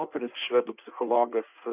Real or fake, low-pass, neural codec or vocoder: fake; 3.6 kHz; codec, 16 kHz in and 24 kHz out, 1.1 kbps, FireRedTTS-2 codec